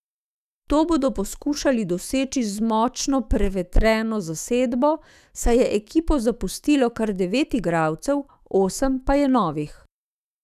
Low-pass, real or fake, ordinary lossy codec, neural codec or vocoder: 14.4 kHz; fake; none; autoencoder, 48 kHz, 128 numbers a frame, DAC-VAE, trained on Japanese speech